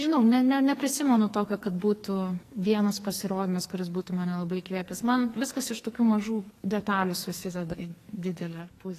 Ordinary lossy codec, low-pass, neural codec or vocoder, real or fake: AAC, 48 kbps; 14.4 kHz; codec, 44.1 kHz, 2.6 kbps, SNAC; fake